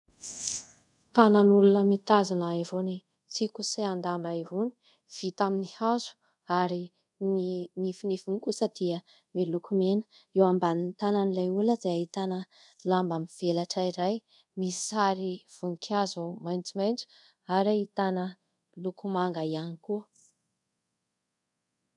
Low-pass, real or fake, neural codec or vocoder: 10.8 kHz; fake; codec, 24 kHz, 0.5 kbps, DualCodec